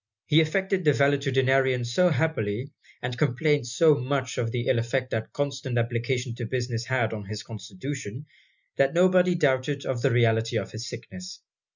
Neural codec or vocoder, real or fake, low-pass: none; real; 7.2 kHz